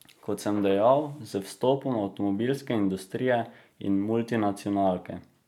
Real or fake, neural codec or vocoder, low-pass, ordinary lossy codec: real; none; 19.8 kHz; none